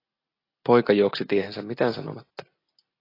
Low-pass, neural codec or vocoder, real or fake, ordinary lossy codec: 5.4 kHz; none; real; AAC, 24 kbps